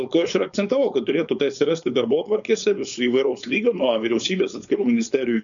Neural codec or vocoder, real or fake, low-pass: codec, 16 kHz, 4.8 kbps, FACodec; fake; 7.2 kHz